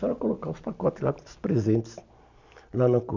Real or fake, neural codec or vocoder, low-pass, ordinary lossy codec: real; none; 7.2 kHz; none